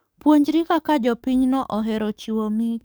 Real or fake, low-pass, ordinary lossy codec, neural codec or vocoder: fake; none; none; codec, 44.1 kHz, 7.8 kbps, DAC